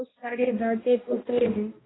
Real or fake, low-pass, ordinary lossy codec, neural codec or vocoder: fake; 7.2 kHz; AAC, 16 kbps; codec, 16 kHz, 1 kbps, X-Codec, HuBERT features, trained on balanced general audio